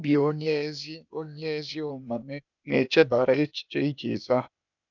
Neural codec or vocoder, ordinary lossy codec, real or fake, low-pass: codec, 16 kHz, 0.8 kbps, ZipCodec; none; fake; 7.2 kHz